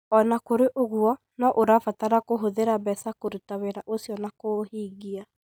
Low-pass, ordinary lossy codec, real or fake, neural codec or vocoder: none; none; real; none